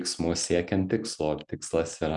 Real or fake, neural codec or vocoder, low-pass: real; none; 10.8 kHz